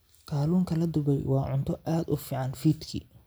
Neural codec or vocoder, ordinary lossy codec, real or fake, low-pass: none; none; real; none